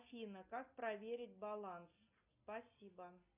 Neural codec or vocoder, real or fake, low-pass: none; real; 3.6 kHz